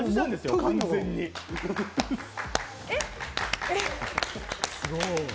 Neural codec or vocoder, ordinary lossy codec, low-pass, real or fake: none; none; none; real